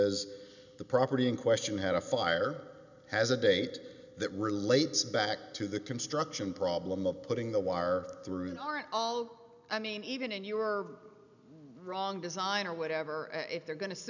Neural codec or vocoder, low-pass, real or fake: none; 7.2 kHz; real